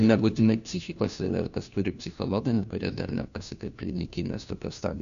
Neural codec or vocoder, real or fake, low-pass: codec, 16 kHz, 1 kbps, FunCodec, trained on LibriTTS, 50 frames a second; fake; 7.2 kHz